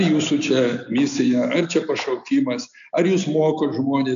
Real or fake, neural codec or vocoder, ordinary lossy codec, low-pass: real; none; AAC, 96 kbps; 7.2 kHz